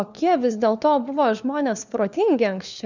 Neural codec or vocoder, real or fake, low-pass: codec, 16 kHz, 4 kbps, FunCodec, trained on LibriTTS, 50 frames a second; fake; 7.2 kHz